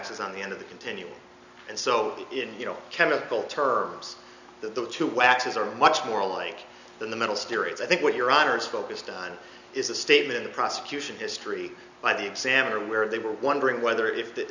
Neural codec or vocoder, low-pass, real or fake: none; 7.2 kHz; real